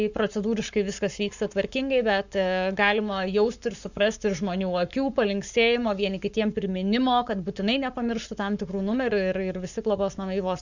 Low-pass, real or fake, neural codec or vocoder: 7.2 kHz; fake; codec, 44.1 kHz, 7.8 kbps, Pupu-Codec